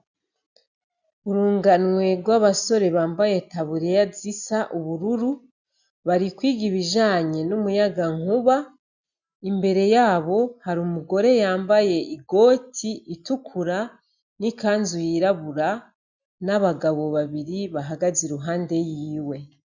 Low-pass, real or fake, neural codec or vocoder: 7.2 kHz; real; none